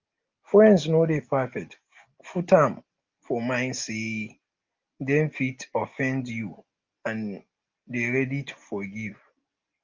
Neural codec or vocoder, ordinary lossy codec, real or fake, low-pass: none; Opus, 24 kbps; real; 7.2 kHz